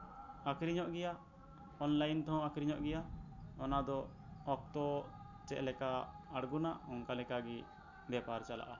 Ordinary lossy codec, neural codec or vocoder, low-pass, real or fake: none; none; 7.2 kHz; real